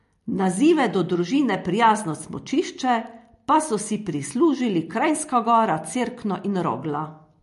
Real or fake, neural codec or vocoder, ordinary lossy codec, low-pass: real; none; MP3, 48 kbps; 14.4 kHz